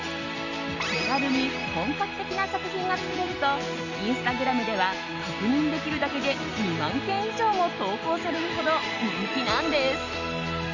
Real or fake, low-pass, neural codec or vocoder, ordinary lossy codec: real; 7.2 kHz; none; none